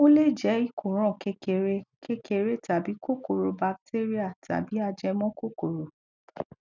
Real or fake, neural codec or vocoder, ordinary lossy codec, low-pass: real; none; none; 7.2 kHz